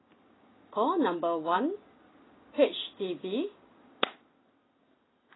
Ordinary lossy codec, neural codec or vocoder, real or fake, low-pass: AAC, 16 kbps; none; real; 7.2 kHz